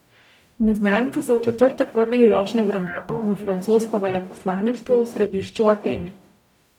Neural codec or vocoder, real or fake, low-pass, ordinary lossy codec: codec, 44.1 kHz, 0.9 kbps, DAC; fake; 19.8 kHz; none